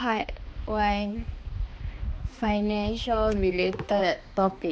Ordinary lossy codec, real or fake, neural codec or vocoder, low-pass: none; fake; codec, 16 kHz, 2 kbps, X-Codec, HuBERT features, trained on balanced general audio; none